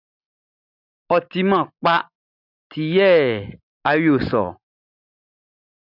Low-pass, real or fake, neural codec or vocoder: 5.4 kHz; real; none